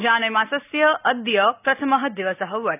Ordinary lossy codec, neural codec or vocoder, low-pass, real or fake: none; none; 3.6 kHz; real